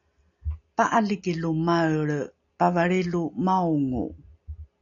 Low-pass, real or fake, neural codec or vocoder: 7.2 kHz; real; none